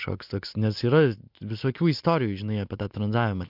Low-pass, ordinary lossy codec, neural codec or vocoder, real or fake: 5.4 kHz; MP3, 48 kbps; none; real